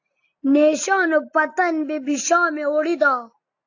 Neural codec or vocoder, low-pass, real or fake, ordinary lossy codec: none; 7.2 kHz; real; AAC, 48 kbps